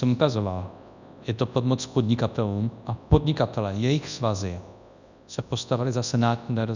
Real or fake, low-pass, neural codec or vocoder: fake; 7.2 kHz; codec, 24 kHz, 0.9 kbps, WavTokenizer, large speech release